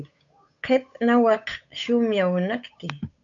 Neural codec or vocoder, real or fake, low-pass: codec, 16 kHz, 8 kbps, FunCodec, trained on Chinese and English, 25 frames a second; fake; 7.2 kHz